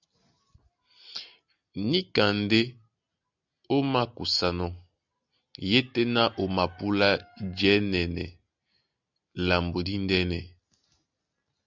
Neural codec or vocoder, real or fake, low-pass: none; real; 7.2 kHz